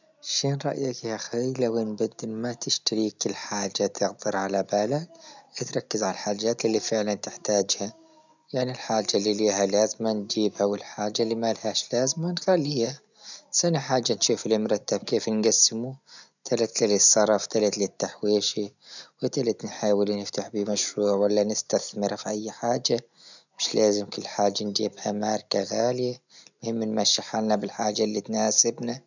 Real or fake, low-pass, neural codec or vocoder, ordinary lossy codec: real; 7.2 kHz; none; none